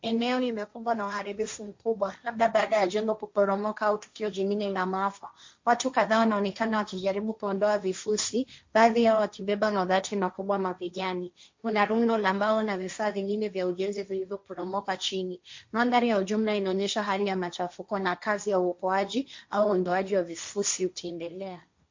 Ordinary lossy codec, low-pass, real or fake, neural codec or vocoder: MP3, 48 kbps; 7.2 kHz; fake; codec, 16 kHz, 1.1 kbps, Voila-Tokenizer